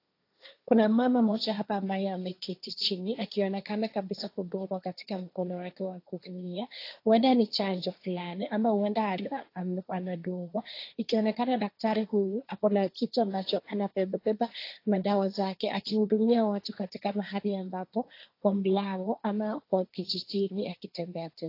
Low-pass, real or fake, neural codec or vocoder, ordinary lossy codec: 5.4 kHz; fake; codec, 16 kHz, 1.1 kbps, Voila-Tokenizer; AAC, 32 kbps